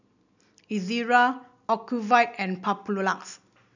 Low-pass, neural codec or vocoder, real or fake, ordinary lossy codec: 7.2 kHz; none; real; none